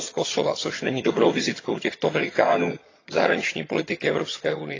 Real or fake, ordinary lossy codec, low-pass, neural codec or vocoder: fake; AAC, 32 kbps; 7.2 kHz; vocoder, 22.05 kHz, 80 mel bands, HiFi-GAN